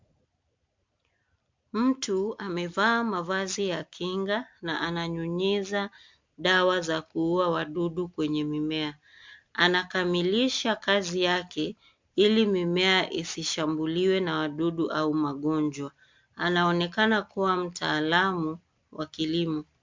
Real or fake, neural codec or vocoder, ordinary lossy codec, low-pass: real; none; MP3, 64 kbps; 7.2 kHz